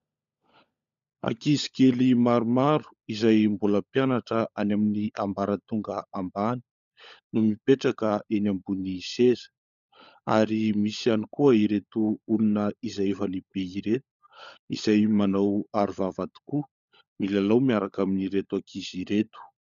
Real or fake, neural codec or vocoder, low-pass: fake; codec, 16 kHz, 16 kbps, FunCodec, trained on LibriTTS, 50 frames a second; 7.2 kHz